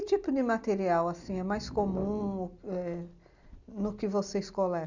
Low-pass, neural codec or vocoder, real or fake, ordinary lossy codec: 7.2 kHz; none; real; Opus, 64 kbps